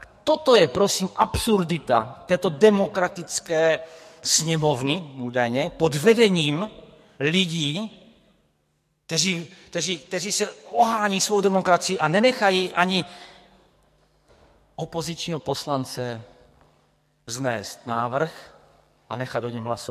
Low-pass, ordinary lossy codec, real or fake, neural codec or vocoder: 14.4 kHz; MP3, 64 kbps; fake; codec, 44.1 kHz, 2.6 kbps, SNAC